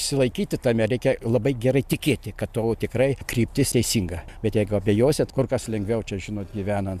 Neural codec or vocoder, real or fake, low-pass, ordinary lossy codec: none; real; 14.4 kHz; MP3, 96 kbps